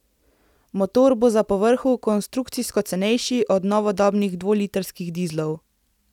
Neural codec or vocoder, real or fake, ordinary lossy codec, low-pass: none; real; none; 19.8 kHz